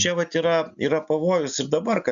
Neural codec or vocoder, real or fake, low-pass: none; real; 7.2 kHz